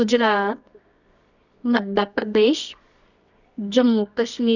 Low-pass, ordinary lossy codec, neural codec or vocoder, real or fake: 7.2 kHz; none; codec, 24 kHz, 0.9 kbps, WavTokenizer, medium music audio release; fake